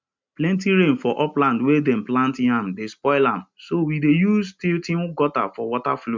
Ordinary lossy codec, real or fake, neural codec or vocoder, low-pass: MP3, 64 kbps; real; none; 7.2 kHz